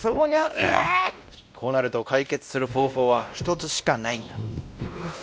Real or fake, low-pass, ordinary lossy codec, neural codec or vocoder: fake; none; none; codec, 16 kHz, 1 kbps, X-Codec, WavLM features, trained on Multilingual LibriSpeech